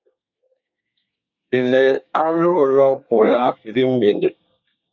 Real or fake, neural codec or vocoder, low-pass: fake; codec, 24 kHz, 1 kbps, SNAC; 7.2 kHz